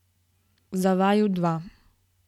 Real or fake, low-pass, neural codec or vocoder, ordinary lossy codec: real; 19.8 kHz; none; none